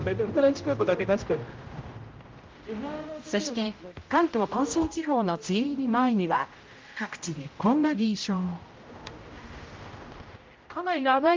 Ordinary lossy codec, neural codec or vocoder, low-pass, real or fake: Opus, 32 kbps; codec, 16 kHz, 0.5 kbps, X-Codec, HuBERT features, trained on general audio; 7.2 kHz; fake